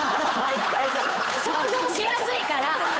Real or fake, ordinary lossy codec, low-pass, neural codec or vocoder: fake; none; none; codec, 16 kHz, 8 kbps, FunCodec, trained on Chinese and English, 25 frames a second